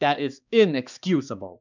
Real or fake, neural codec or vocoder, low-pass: fake; codec, 16 kHz, 2 kbps, X-Codec, HuBERT features, trained on balanced general audio; 7.2 kHz